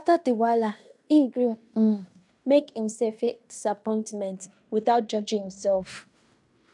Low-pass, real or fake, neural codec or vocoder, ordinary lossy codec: 10.8 kHz; fake; codec, 16 kHz in and 24 kHz out, 0.9 kbps, LongCat-Audio-Codec, fine tuned four codebook decoder; none